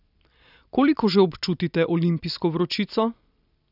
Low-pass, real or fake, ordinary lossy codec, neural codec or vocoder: 5.4 kHz; real; none; none